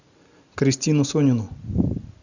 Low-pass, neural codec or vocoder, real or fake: 7.2 kHz; none; real